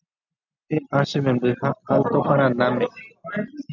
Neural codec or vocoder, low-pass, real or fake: none; 7.2 kHz; real